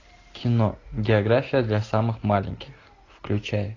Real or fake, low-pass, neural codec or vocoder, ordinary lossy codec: real; 7.2 kHz; none; AAC, 32 kbps